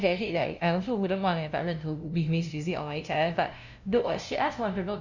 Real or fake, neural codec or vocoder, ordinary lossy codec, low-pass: fake; codec, 16 kHz, 0.5 kbps, FunCodec, trained on LibriTTS, 25 frames a second; none; 7.2 kHz